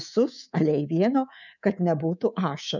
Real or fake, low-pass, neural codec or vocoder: fake; 7.2 kHz; codec, 24 kHz, 3.1 kbps, DualCodec